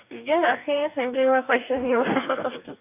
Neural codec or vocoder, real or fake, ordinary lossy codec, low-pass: codec, 44.1 kHz, 2.6 kbps, DAC; fake; none; 3.6 kHz